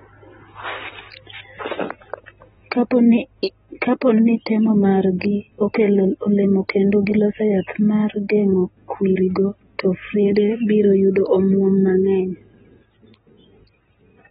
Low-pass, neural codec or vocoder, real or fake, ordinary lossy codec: 19.8 kHz; none; real; AAC, 16 kbps